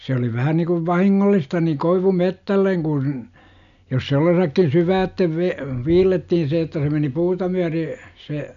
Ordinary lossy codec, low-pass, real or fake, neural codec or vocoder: none; 7.2 kHz; real; none